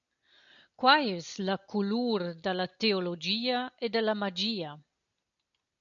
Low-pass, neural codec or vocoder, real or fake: 7.2 kHz; none; real